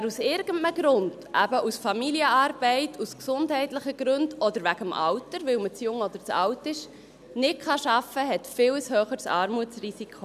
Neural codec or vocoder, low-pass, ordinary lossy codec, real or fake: none; 14.4 kHz; none; real